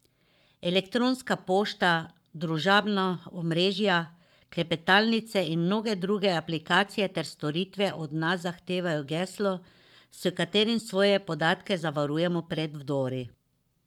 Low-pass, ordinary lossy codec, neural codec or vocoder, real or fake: 19.8 kHz; none; codec, 44.1 kHz, 7.8 kbps, Pupu-Codec; fake